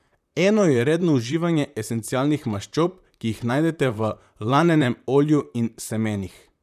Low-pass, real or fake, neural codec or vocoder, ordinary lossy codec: 14.4 kHz; fake; vocoder, 44.1 kHz, 128 mel bands, Pupu-Vocoder; none